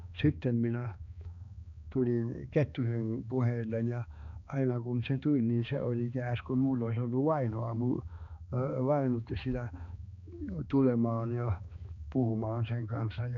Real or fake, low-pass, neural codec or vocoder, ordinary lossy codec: fake; 7.2 kHz; codec, 16 kHz, 2 kbps, X-Codec, HuBERT features, trained on balanced general audio; none